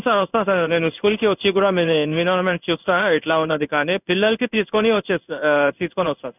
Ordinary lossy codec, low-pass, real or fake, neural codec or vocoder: none; 3.6 kHz; fake; codec, 16 kHz in and 24 kHz out, 1 kbps, XY-Tokenizer